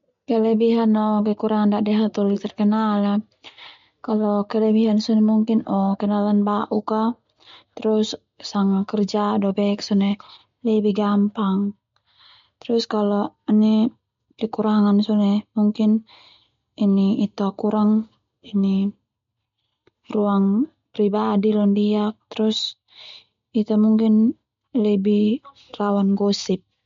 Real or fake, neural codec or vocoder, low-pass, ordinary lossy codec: real; none; 7.2 kHz; MP3, 48 kbps